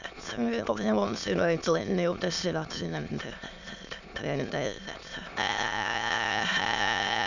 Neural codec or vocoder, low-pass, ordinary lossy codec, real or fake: autoencoder, 22.05 kHz, a latent of 192 numbers a frame, VITS, trained on many speakers; 7.2 kHz; none; fake